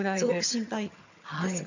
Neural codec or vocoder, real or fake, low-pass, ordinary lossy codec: vocoder, 22.05 kHz, 80 mel bands, HiFi-GAN; fake; 7.2 kHz; none